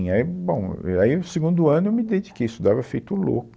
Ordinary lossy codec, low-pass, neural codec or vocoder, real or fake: none; none; none; real